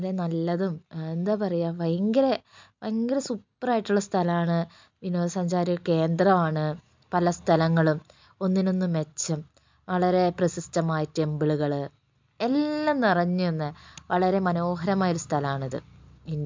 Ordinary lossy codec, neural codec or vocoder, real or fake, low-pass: MP3, 64 kbps; none; real; 7.2 kHz